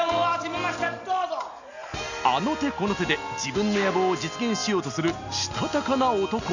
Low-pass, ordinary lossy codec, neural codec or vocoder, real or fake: 7.2 kHz; none; none; real